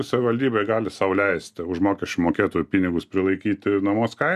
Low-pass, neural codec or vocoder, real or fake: 14.4 kHz; none; real